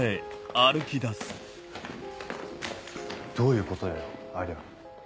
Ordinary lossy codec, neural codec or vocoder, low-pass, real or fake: none; none; none; real